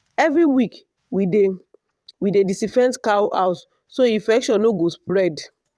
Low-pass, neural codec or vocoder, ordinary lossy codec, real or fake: none; vocoder, 22.05 kHz, 80 mel bands, WaveNeXt; none; fake